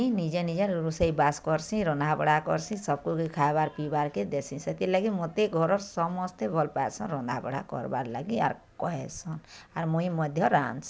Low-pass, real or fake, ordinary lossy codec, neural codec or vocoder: none; real; none; none